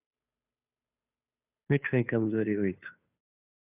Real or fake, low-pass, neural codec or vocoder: fake; 3.6 kHz; codec, 16 kHz, 2 kbps, FunCodec, trained on Chinese and English, 25 frames a second